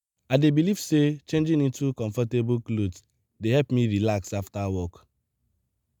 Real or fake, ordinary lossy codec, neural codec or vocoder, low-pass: real; none; none; none